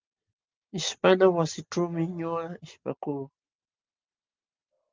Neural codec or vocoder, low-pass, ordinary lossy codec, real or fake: none; 7.2 kHz; Opus, 24 kbps; real